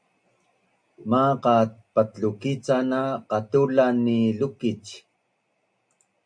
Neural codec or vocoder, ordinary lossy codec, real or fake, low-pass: none; MP3, 64 kbps; real; 9.9 kHz